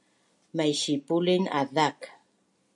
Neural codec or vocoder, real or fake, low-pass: none; real; 10.8 kHz